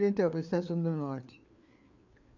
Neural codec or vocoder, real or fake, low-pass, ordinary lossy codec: codec, 16 kHz, 4 kbps, FreqCodec, larger model; fake; 7.2 kHz; none